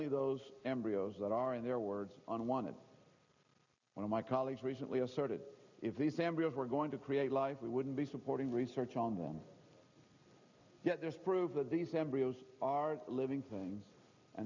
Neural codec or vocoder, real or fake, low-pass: none; real; 7.2 kHz